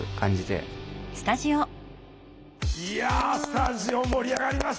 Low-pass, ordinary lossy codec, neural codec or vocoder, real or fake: none; none; none; real